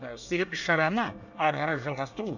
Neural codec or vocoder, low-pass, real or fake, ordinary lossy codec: codec, 24 kHz, 1 kbps, SNAC; 7.2 kHz; fake; none